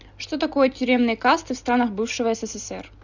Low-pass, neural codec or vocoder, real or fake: 7.2 kHz; none; real